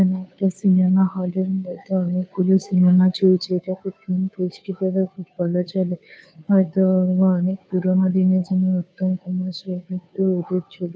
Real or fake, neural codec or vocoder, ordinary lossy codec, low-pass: fake; codec, 16 kHz, 2 kbps, FunCodec, trained on Chinese and English, 25 frames a second; none; none